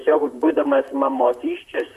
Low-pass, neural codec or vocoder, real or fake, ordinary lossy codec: 14.4 kHz; vocoder, 44.1 kHz, 128 mel bands, Pupu-Vocoder; fake; MP3, 64 kbps